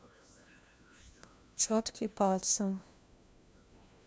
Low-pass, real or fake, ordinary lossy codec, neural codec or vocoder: none; fake; none; codec, 16 kHz, 1 kbps, FunCodec, trained on LibriTTS, 50 frames a second